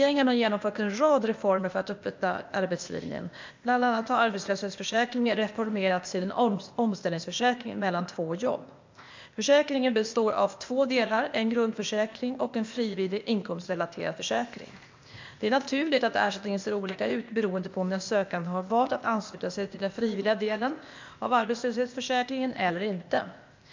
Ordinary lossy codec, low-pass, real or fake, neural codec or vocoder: MP3, 64 kbps; 7.2 kHz; fake; codec, 16 kHz, 0.8 kbps, ZipCodec